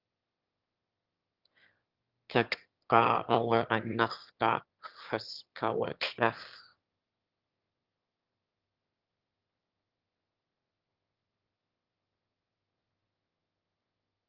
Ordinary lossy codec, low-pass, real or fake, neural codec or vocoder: Opus, 24 kbps; 5.4 kHz; fake; autoencoder, 22.05 kHz, a latent of 192 numbers a frame, VITS, trained on one speaker